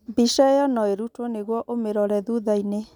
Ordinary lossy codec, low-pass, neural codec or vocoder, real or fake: none; 19.8 kHz; none; real